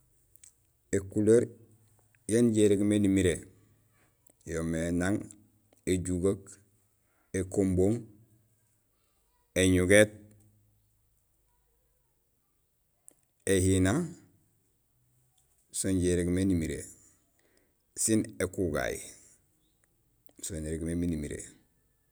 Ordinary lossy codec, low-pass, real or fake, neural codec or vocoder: none; none; real; none